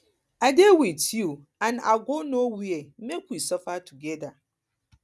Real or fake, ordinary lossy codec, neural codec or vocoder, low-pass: real; none; none; none